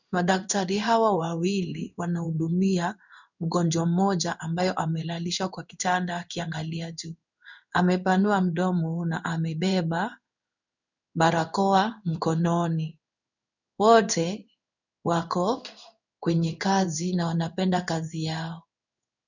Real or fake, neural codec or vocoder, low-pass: fake; codec, 16 kHz in and 24 kHz out, 1 kbps, XY-Tokenizer; 7.2 kHz